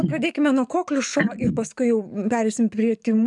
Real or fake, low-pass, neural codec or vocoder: fake; 10.8 kHz; codec, 44.1 kHz, 7.8 kbps, DAC